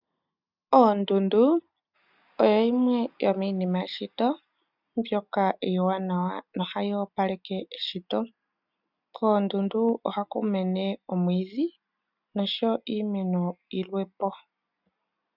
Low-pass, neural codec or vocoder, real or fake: 5.4 kHz; none; real